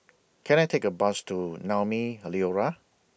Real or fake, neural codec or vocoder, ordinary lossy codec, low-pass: real; none; none; none